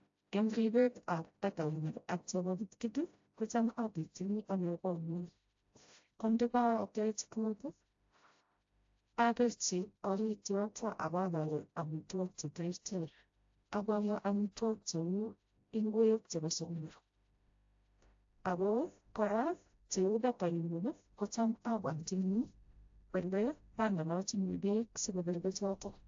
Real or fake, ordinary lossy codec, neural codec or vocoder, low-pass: fake; AAC, 48 kbps; codec, 16 kHz, 0.5 kbps, FreqCodec, smaller model; 7.2 kHz